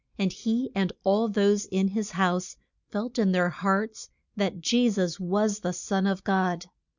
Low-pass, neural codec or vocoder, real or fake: 7.2 kHz; none; real